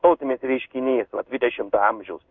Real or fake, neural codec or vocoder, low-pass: fake; codec, 16 kHz in and 24 kHz out, 1 kbps, XY-Tokenizer; 7.2 kHz